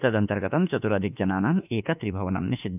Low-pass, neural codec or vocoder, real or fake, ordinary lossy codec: 3.6 kHz; autoencoder, 48 kHz, 32 numbers a frame, DAC-VAE, trained on Japanese speech; fake; none